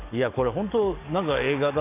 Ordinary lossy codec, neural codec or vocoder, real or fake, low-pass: none; codec, 44.1 kHz, 7.8 kbps, DAC; fake; 3.6 kHz